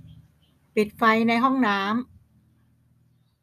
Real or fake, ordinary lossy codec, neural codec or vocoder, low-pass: real; none; none; 14.4 kHz